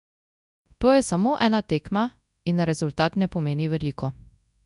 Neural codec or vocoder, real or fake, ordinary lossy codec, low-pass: codec, 24 kHz, 0.9 kbps, WavTokenizer, large speech release; fake; none; 10.8 kHz